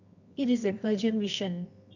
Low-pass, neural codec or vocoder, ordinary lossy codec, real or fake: 7.2 kHz; codec, 24 kHz, 0.9 kbps, WavTokenizer, medium music audio release; none; fake